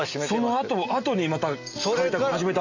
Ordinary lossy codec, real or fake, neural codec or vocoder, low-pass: AAC, 48 kbps; real; none; 7.2 kHz